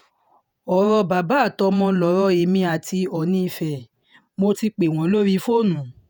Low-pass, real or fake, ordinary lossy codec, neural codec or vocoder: none; fake; none; vocoder, 48 kHz, 128 mel bands, Vocos